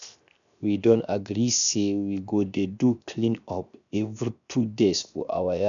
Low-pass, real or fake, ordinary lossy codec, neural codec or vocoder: 7.2 kHz; fake; none; codec, 16 kHz, 0.7 kbps, FocalCodec